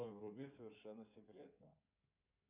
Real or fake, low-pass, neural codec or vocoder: fake; 3.6 kHz; codec, 16 kHz in and 24 kHz out, 2.2 kbps, FireRedTTS-2 codec